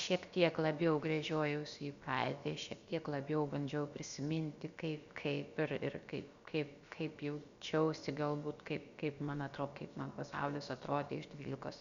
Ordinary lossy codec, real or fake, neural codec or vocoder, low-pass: Opus, 64 kbps; fake; codec, 16 kHz, about 1 kbps, DyCAST, with the encoder's durations; 7.2 kHz